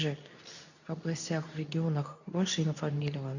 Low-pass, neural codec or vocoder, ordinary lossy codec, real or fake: 7.2 kHz; codec, 24 kHz, 0.9 kbps, WavTokenizer, medium speech release version 2; none; fake